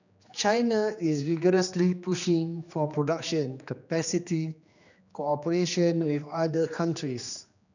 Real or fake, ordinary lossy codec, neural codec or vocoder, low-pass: fake; none; codec, 16 kHz, 2 kbps, X-Codec, HuBERT features, trained on general audio; 7.2 kHz